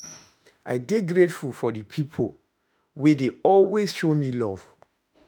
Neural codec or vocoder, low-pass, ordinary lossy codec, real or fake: autoencoder, 48 kHz, 32 numbers a frame, DAC-VAE, trained on Japanese speech; none; none; fake